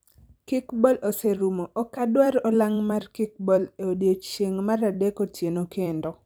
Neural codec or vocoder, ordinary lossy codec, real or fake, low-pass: vocoder, 44.1 kHz, 128 mel bands every 256 samples, BigVGAN v2; none; fake; none